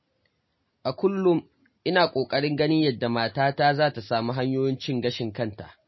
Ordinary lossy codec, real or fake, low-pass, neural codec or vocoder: MP3, 24 kbps; real; 7.2 kHz; none